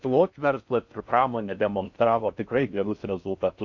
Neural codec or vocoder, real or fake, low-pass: codec, 16 kHz in and 24 kHz out, 0.6 kbps, FocalCodec, streaming, 4096 codes; fake; 7.2 kHz